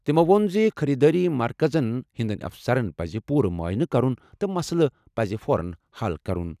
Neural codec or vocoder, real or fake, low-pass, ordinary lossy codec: none; real; 14.4 kHz; none